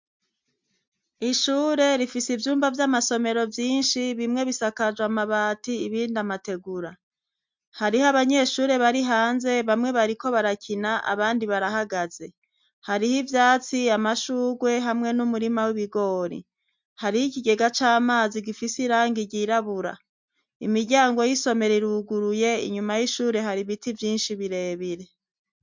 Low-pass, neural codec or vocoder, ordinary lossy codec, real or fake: 7.2 kHz; none; MP3, 64 kbps; real